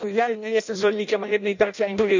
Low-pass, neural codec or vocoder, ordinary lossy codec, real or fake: 7.2 kHz; codec, 16 kHz in and 24 kHz out, 0.6 kbps, FireRedTTS-2 codec; none; fake